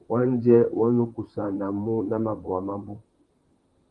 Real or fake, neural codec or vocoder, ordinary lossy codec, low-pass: fake; vocoder, 44.1 kHz, 128 mel bands, Pupu-Vocoder; Opus, 24 kbps; 10.8 kHz